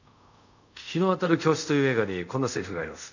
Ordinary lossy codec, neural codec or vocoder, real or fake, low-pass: none; codec, 24 kHz, 0.5 kbps, DualCodec; fake; 7.2 kHz